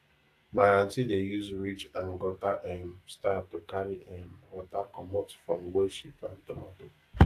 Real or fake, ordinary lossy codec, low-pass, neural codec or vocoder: fake; AAC, 64 kbps; 14.4 kHz; codec, 44.1 kHz, 2.6 kbps, SNAC